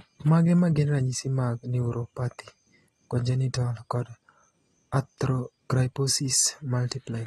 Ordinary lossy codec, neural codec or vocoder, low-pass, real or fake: AAC, 32 kbps; none; 19.8 kHz; real